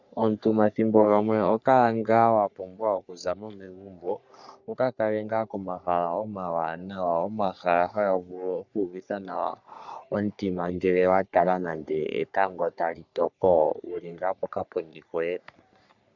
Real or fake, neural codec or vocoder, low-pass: fake; codec, 44.1 kHz, 3.4 kbps, Pupu-Codec; 7.2 kHz